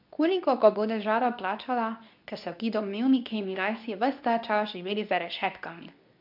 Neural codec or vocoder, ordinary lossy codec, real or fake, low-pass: codec, 24 kHz, 0.9 kbps, WavTokenizer, medium speech release version 2; MP3, 48 kbps; fake; 5.4 kHz